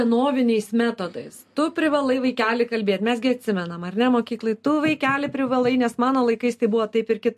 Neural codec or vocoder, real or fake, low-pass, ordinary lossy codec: none; real; 14.4 kHz; MP3, 64 kbps